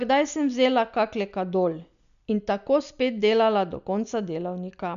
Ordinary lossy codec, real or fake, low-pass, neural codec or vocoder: none; real; 7.2 kHz; none